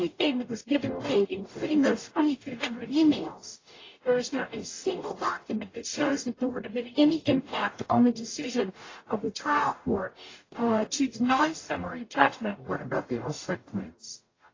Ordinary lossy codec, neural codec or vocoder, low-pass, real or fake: AAC, 32 kbps; codec, 44.1 kHz, 0.9 kbps, DAC; 7.2 kHz; fake